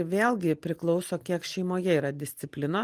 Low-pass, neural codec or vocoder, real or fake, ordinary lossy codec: 14.4 kHz; none; real; Opus, 24 kbps